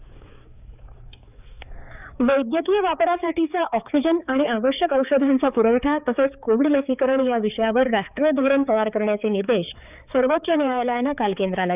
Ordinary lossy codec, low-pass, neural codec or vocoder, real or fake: none; 3.6 kHz; codec, 16 kHz, 4 kbps, X-Codec, HuBERT features, trained on balanced general audio; fake